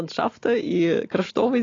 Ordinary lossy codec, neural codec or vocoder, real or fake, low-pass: AAC, 32 kbps; none; real; 7.2 kHz